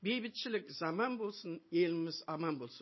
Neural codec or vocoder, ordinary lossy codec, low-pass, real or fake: none; MP3, 24 kbps; 7.2 kHz; real